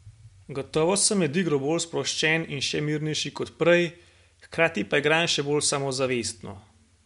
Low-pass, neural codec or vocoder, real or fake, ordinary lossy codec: 10.8 kHz; none; real; MP3, 64 kbps